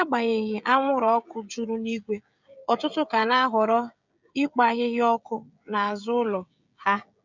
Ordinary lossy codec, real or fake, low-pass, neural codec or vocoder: none; fake; 7.2 kHz; codec, 16 kHz, 16 kbps, FreqCodec, smaller model